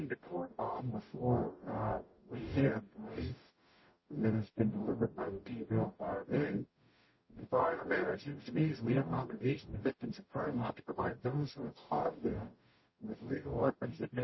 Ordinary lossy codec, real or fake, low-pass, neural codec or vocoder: MP3, 24 kbps; fake; 7.2 kHz; codec, 44.1 kHz, 0.9 kbps, DAC